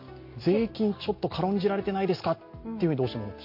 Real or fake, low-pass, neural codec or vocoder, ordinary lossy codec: real; 5.4 kHz; none; MP3, 32 kbps